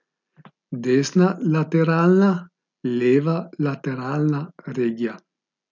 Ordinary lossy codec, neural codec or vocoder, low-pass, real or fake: none; none; 7.2 kHz; real